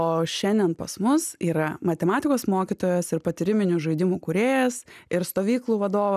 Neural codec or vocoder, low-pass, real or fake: none; 14.4 kHz; real